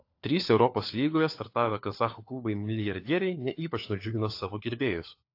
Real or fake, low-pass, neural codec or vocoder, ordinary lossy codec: fake; 5.4 kHz; codec, 16 kHz, 4 kbps, FunCodec, trained on LibriTTS, 50 frames a second; AAC, 32 kbps